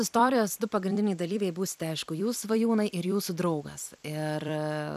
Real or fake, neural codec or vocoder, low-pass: fake; vocoder, 44.1 kHz, 128 mel bands every 256 samples, BigVGAN v2; 14.4 kHz